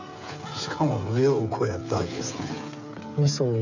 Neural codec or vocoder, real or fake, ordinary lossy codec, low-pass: codec, 16 kHz, 8 kbps, FreqCodec, smaller model; fake; none; 7.2 kHz